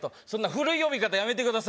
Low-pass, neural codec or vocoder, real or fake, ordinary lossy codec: none; none; real; none